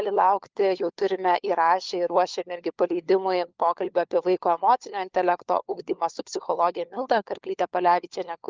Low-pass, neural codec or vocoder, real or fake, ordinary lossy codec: 7.2 kHz; codec, 16 kHz, 4 kbps, FunCodec, trained on LibriTTS, 50 frames a second; fake; Opus, 32 kbps